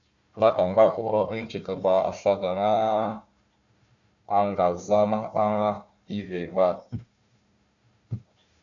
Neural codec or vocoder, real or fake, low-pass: codec, 16 kHz, 1 kbps, FunCodec, trained on Chinese and English, 50 frames a second; fake; 7.2 kHz